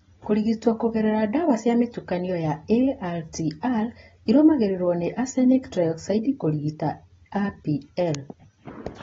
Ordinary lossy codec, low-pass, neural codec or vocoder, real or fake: AAC, 24 kbps; 7.2 kHz; none; real